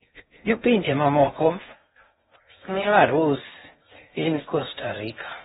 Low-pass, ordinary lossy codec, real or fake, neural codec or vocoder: 10.8 kHz; AAC, 16 kbps; fake; codec, 16 kHz in and 24 kHz out, 0.6 kbps, FocalCodec, streaming, 2048 codes